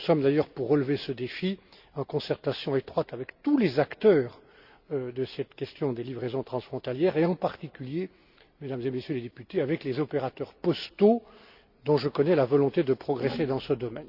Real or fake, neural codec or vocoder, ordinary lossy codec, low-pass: real; none; Opus, 64 kbps; 5.4 kHz